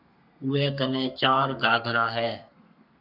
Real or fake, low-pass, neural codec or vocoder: fake; 5.4 kHz; codec, 32 kHz, 1.9 kbps, SNAC